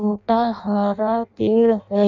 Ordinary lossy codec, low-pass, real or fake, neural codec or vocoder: none; 7.2 kHz; fake; codec, 16 kHz in and 24 kHz out, 0.6 kbps, FireRedTTS-2 codec